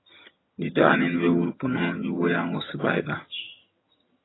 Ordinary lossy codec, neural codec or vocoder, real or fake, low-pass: AAC, 16 kbps; vocoder, 22.05 kHz, 80 mel bands, HiFi-GAN; fake; 7.2 kHz